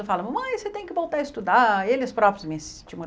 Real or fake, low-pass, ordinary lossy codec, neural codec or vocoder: real; none; none; none